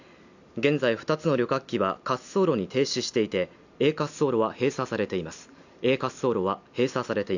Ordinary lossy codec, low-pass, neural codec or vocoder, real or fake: none; 7.2 kHz; none; real